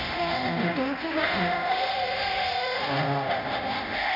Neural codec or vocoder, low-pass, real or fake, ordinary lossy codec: codec, 24 kHz, 0.9 kbps, DualCodec; 5.4 kHz; fake; AAC, 32 kbps